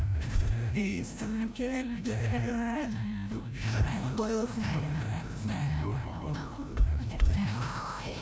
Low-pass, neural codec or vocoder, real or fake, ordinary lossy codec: none; codec, 16 kHz, 0.5 kbps, FreqCodec, larger model; fake; none